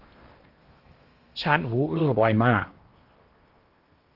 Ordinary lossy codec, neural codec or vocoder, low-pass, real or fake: Opus, 24 kbps; codec, 16 kHz in and 24 kHz out, 0.8 kbps, FocalCodec, streaming, 65536 codes; 5.4 kHz; fake